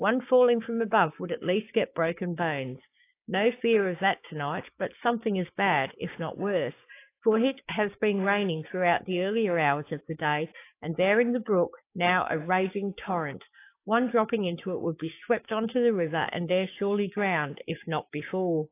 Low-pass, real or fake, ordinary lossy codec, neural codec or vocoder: 3.6 kHz; fake; AAC, 24 kbps; codec, 16 kHz, 6 kbps, DAC